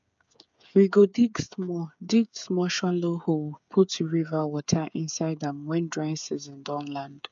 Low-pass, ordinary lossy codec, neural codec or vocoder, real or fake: 7.2 kHz; MP3, 64 kbps; codec, 16 kHz, 8 kbps, FreqCodec, smaller model; fake